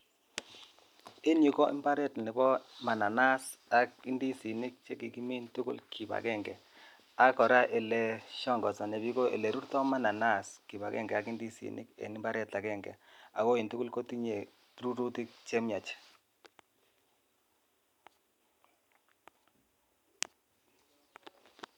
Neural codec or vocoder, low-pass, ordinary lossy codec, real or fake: none; 19.8 kHz; none; real